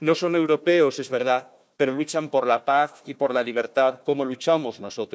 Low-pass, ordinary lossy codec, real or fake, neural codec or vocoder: none; none; fake; codec, 16 kHz, 1 kbps, FunCodec, trained on Chinese and English, 50 frames a second